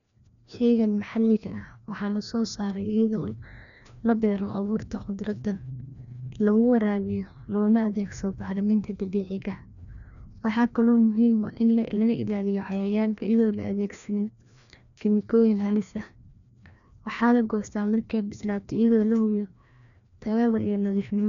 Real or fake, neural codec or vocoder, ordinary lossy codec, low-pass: fake; codec, 16 kHz, 1 kbps, FreqCodec, larger model; none; 7.2 kHz